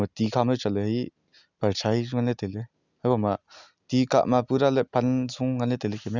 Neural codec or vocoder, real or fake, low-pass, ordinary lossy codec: none; real; 7.2 kHz; none